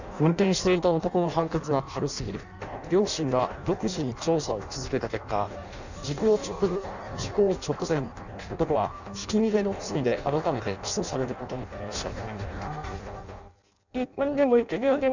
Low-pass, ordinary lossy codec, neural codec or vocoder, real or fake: 7.2 kHz; none; codec, 16 kHz in and 24 kHz out, 0.6 kbps, FireRedTTS-2 codec; fake